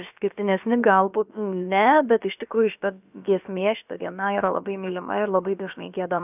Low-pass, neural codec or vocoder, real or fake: 3.6 kHz; codec, 16 kHz, about 1 kbps, DyCAST, with the encoder's durations; fake